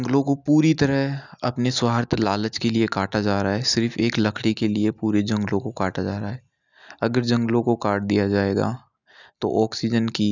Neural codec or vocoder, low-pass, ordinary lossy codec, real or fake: none; 7.2 kHz; none; real